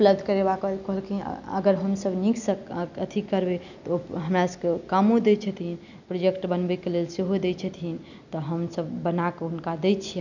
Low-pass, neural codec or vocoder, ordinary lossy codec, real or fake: 7.2 kHz; none; none; real